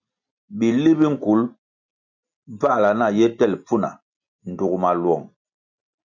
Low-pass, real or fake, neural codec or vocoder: 7.2 kHz; real; none